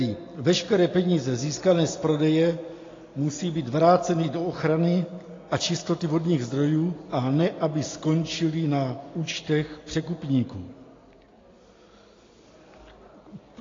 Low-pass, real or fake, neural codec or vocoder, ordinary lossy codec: 7.2 kHz; real; none; AAC, 32 kbps